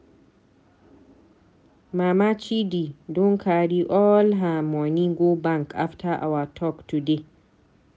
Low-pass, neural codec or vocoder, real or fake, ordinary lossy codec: none; none; real; none